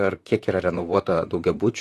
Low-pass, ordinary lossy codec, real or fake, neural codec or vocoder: 14.4 kHz; AAC, 48 kbps; fake; vocoder, 44.1 kHz, 128 mel bands, Pupu-Vocoder